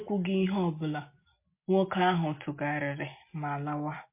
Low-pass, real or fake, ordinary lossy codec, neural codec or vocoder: 3.6 kHz; real; MP3, 24 kbps; none